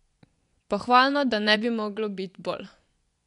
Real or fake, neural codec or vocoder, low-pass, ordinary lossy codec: fake; vocoder, 24 kHz, 100 mel bands, Vocos; 10.8 kHz; none